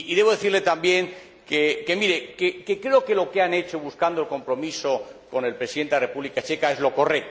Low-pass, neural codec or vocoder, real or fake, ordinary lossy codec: none; none; real; none